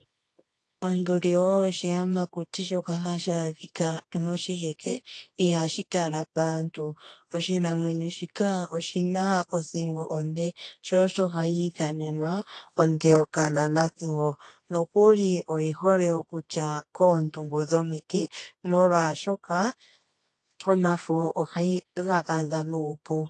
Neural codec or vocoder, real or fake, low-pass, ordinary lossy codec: codec, 24 kHz, 0.9 kbps, WavTokenizer, medium music audio release; fake; 10.8 kHz; AAC, 48 kbps